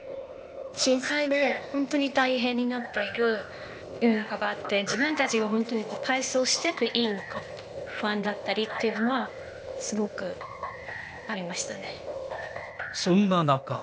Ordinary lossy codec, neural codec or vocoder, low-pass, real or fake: none; codec, 16 kHz, 0.8 kbps, ZipCodec; none; fake